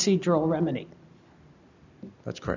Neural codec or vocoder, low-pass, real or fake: none; 7.2 kHz; real